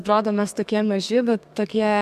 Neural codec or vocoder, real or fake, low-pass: codec, 32 kHz, 1.9 kbps, SNAC; fake; 14.4 kHz